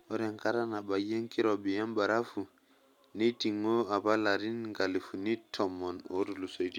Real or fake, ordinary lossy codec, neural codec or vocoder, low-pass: real; none; none; 19.8 kHz